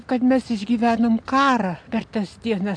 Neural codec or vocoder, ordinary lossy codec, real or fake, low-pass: none; AAC, 64 kbps; real; 9.9 kHz